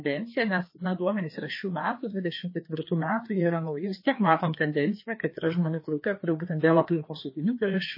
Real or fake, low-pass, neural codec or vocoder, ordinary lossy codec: fake; 5.4 kHz; codec, 16 kHz, 2 kbps, FreqCodec, larger model; MP3, 24 kbps